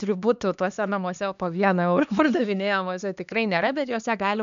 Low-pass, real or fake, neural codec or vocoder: 7.2 kHz; fake; codec, 16 kHz, 2 kbps, X-Codec, HuBERT features, trained on LibriSpeech